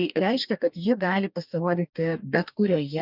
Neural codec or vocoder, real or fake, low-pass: codec, 44.1 kHz, 2.6 kbps, DAC; fake; 5.4 kHz